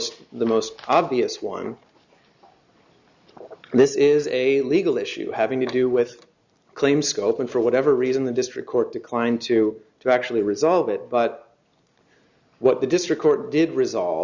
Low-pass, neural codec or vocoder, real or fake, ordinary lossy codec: 7.2 kHz; none; real; Opus, 64 kbps